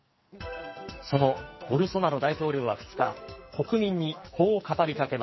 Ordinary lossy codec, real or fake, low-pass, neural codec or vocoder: MP3, 24 kbps; fake; 7.2 kHz; codec, 44.1 kHz, 2.6 kbps, SNAC